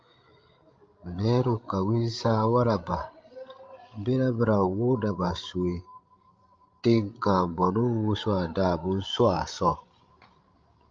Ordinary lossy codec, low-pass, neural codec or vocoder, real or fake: Opus, 24 kbps; 7.2 kHz; codec, 16 kHz, 16 kbps, FreqCodec, larger model; fake